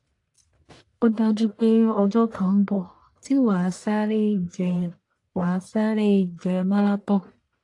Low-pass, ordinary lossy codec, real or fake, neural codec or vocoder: 10.8 kHz; AAC, 48 kbps; fake; codec, 44.1 kHz, 1.7 kbps, Pupu-Codec